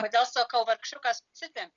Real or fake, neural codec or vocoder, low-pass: real; none; 7.2 kHz